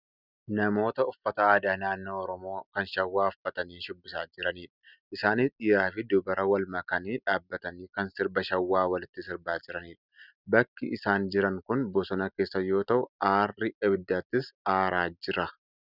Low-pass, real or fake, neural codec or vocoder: 5.4 kHz; real; none